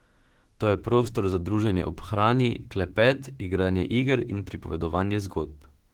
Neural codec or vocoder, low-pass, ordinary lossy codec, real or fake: autoencoder, 48 kHz, 32 numbers a frame, DAC-VAE, trained on Japanese speech; 19.8 kHz; Opus, 16 kbps; fake